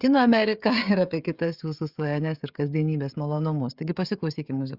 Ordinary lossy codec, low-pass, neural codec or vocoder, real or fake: Opus, 64 kbps; 5.4 kHz; codec, 16 kHz, 16 kbps, FreqCodec, smaller model; fake